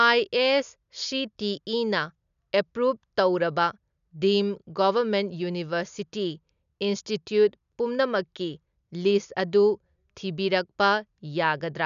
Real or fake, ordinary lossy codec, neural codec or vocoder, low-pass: real; none; none; 7.2 kHz